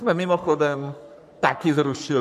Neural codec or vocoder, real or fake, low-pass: codec, 44.1 kHz, 3.4 kbps, Pupu-Codec; fake; 14.4 kHz